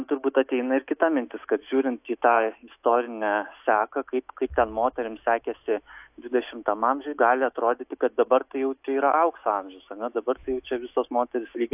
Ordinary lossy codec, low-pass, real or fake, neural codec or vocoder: AAC, 32 kbps; 3.6 kHz; fake; autoencoder, 48 kHz, 128 numbers a frame, DAC-VAE, trained on Japanese speech